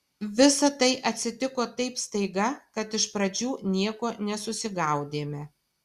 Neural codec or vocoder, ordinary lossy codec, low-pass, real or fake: none; Opus, 64 kbps; 14.4 kHz; real